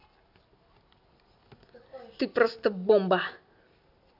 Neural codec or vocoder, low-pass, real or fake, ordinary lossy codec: none; 5.4 kHz; real; none